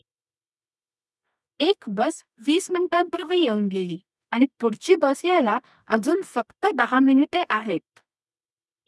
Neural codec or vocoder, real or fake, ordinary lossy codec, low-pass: codec, 24 kHz, 0.9 kbps, WavTokenizer, medium music audio release; fake; none; none